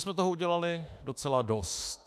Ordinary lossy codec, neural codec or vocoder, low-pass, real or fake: MP3, 96 kbps; autoencoder, 48 kHz, 32 numbers a frame, DAC-VAE, trained on Japanese speech; 14.4 kHz; fake